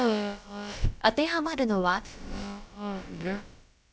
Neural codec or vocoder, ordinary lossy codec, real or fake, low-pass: codec, 16 kHz, about 1 kbps, DyCAST, with the encoder's durations; none; fake; none